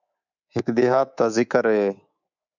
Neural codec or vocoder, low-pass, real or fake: codec, 24 kHz, 3.1 kbps, DualCodec; 7.2 kHz; fake